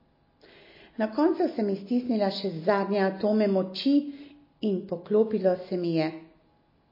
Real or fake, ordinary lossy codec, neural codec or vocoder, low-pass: real; MP3, 24 kbps; none; 5.4 kHz